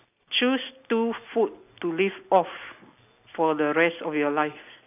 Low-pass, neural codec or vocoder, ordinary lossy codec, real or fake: 3.6 kHz; none; none; real